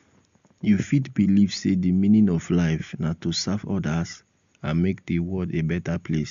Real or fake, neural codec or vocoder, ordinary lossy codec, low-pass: real; none; MP3, 64 kbps; 7.2 kHz